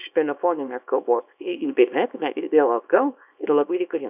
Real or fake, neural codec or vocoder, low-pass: fake; codec, 24 kHz, 0.9 kbps, WavTokenizer, small release; 3.6 kHz